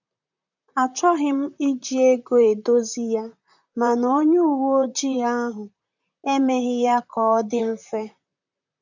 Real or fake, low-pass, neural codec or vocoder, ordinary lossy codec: fake; 7.2 kHz; vocoder, 44.1 kHz, 128 mel bands, Pupu-Vocoder; none